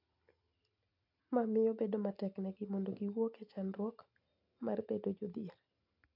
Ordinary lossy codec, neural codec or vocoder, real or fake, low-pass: AAC, 48 kbps; none; real; 5.4 kHz